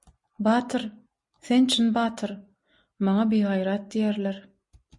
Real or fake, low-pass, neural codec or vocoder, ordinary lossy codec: real; 10.8 kHz; none; MP3, 48 kbps